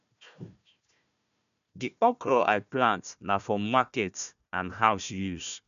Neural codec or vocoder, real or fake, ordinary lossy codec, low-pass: codec, 16 kHz, 1 kbps, FunCodec, trained on Chinese and English, 50 frames a second; fake; none; 7.2 kHz